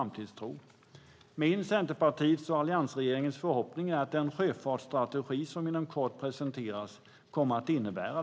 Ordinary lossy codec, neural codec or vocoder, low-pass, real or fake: none; none; none; real